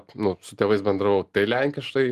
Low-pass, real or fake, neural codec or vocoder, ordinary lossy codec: 14.4 kHz; real; none; Opus, 24 kbps